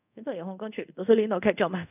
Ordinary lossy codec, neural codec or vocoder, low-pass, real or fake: none; codec, 24 kHz, 0.5 kbps, DualCodec; 3.6 kHz; fake